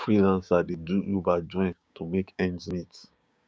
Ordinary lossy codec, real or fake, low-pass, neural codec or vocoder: none; fake; none; codec, 16 kHz, 6 kbps, DAC